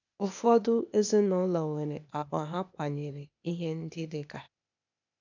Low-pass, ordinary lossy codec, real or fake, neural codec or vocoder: 7.2 kHz; none; fake; codec, 16 kHz, 0.8 kbps, ZipCodec